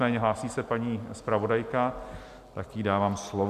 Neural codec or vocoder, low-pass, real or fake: none; 14.4 kHz; real